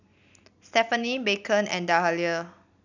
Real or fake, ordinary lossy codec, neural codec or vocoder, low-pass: real; none; none; 7.2 kHz